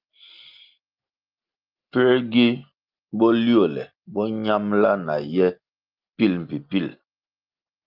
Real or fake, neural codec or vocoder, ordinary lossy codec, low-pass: real; none; Opus, 32 kbps; 5.4 kHz